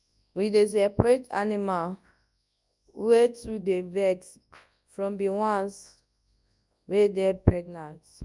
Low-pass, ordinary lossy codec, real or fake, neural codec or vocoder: 10.8 kHz; none; fake; codec, 24 kHz, 0.9 kbps, WavTokenizer, large speech release